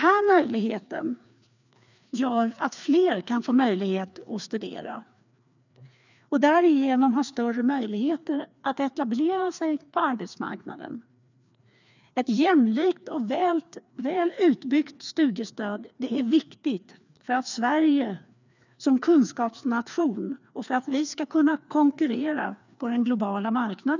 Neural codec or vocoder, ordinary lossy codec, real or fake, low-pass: codec, 16 kHz, 2 kbps, FreqCodec, larger model; none; fake; 7.2 kHz